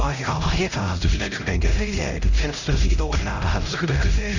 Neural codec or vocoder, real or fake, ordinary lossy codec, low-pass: codec, 16 kHz, 0.5 kbps, X-Codec, HuBERT features, trained on LibriSpeech; fake; none; 7.2 kHz